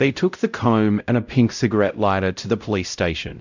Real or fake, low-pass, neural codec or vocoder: fake; 7.2 kHz; codec, 16 kHz, 0.5 kbps, X-Codec, WavLM features, trained on Multilingual LibriSpeech